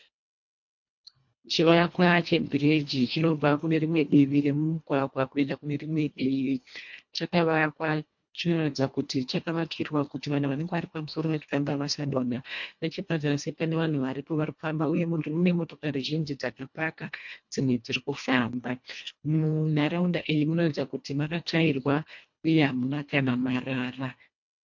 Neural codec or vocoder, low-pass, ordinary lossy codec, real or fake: codec, 24 kHz, 1.5 kbps, HILCodec; 7.2 kHz; MP3, 48 kbps; fake